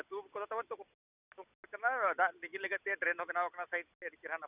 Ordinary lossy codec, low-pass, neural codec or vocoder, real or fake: none; 3.6 kHz; none; real